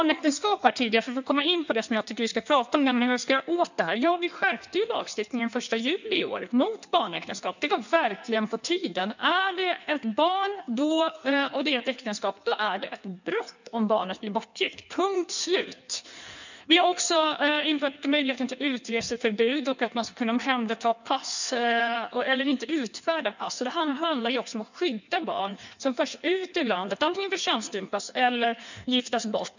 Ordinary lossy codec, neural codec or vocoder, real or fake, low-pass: none; codec, 16 kHz in and 24 kHz out, 1.1 kbps, FireRedTTS-2 codec; fake; 7.2 kHz